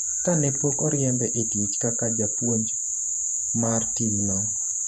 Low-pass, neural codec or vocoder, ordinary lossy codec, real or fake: 14.4 kHz; vocoder, 48 kHz, 128 mel bands, Vocos; none; fake